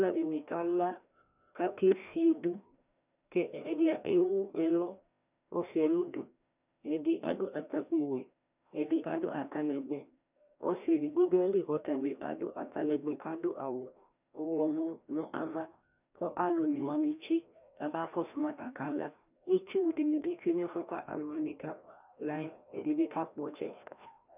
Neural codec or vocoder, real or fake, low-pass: codec, 16 kHz, 1 kbps, FreqCodec, larger model; fake; 3.6 kHz